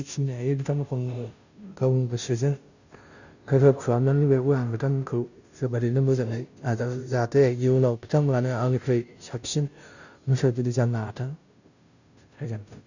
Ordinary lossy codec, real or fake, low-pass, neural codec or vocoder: none; fake; 7.2 kHz; codec, 16 kHz, 0.5 kbps, FunCodec, trained on Chinese and English, 25 frames a second